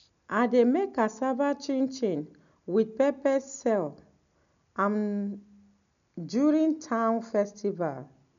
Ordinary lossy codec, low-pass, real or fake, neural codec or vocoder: none; 7.2 kHz; real; none